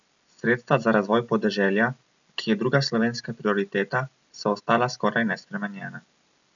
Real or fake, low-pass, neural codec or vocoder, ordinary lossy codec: real; 7.2 kHz; none; none